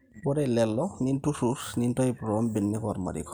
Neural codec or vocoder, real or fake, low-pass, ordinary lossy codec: none; real; none; none